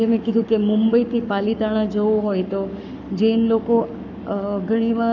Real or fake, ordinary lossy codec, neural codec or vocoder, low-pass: fake; none; codec, 44.1 kHz, 7.8 kbps, Pupu-Codec; 7.2 kHz